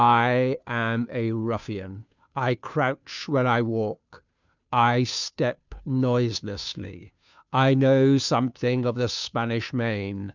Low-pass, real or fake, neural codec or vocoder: 7.2 kHz; fake; codec, 16 kHz, 2 kbps, FunCodec, trained on Chinese and English, 25 frames a second